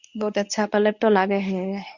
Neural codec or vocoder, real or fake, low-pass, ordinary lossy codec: codec, 24 kHz, 0.9 kbps, WavTokenizer, medium speech release version 1; fake; 7.2 kHz; none